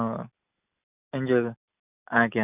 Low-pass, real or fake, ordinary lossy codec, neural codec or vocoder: 3.6 kHz; real; none; none